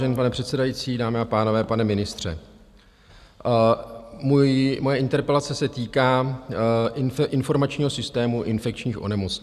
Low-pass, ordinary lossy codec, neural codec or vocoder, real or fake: 14.4 kHz; Opus, 64 kbps; none; real